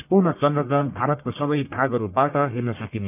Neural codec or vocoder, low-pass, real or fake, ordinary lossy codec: codec, 44.1 kHz, 1.7 kbps, Pupu-Codec; 3.6 kHz; fake; none